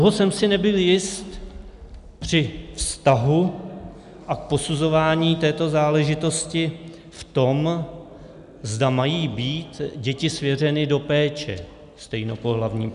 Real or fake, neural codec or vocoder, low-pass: real; none; 10.8 kHz